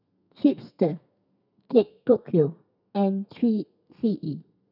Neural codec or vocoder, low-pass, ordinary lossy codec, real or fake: codec, 32 kHz, 1.9 kbps, SNAC; 5.4 kHz; none; fake